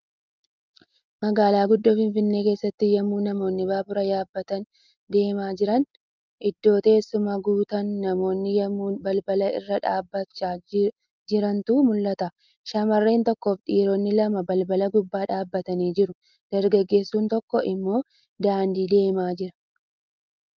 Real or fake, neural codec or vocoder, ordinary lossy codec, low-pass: real; none; Opus, 24 kbps; 7.2 kHz